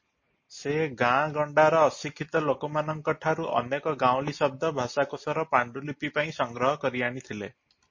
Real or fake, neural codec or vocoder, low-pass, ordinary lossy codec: real; none; 7.2 kHz; MP3, 32 kbps